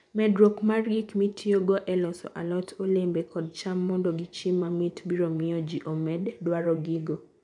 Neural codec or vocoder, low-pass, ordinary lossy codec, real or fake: vocoder, 24 kHz, 100 mel bands, Vocos; 10.8 kHz; MP3, 96 kbps; fake